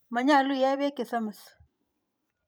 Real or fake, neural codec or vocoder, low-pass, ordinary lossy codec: fake; vocoder, 44.1 kHz, 128 mel bands every 512 samples, BigVGAN v2; none; none